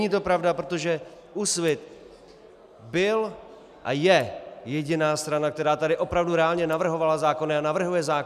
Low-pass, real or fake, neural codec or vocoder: 14.4 kHz; real; none